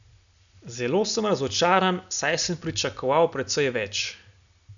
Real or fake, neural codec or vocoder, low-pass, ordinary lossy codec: real; none; 7.2 kHz; none